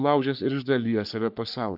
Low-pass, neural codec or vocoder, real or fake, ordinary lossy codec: 5.4 kHz; codec, 44.1 kHz, 3.4 kbps, Pupu-Codec; fake; AAC, 48 kbps